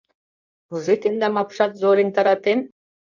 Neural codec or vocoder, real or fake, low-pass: codec, 16 kHz in and 24 kHz out, 1.1 kbps, FireRedTTS-2 codec; fake; 7.2 kHz